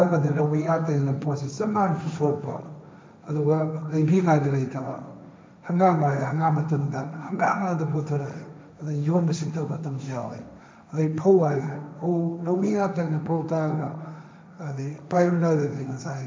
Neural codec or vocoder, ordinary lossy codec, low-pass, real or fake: codec, 16 kHz, 1.1 kbps, Voila-Tokenizer; none; none; fake